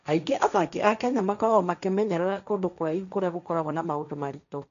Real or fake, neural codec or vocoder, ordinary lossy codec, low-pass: fake; codec, 16 kHz, 1.1 kbps, Voila-Tokenizer; none; 7.2 kHz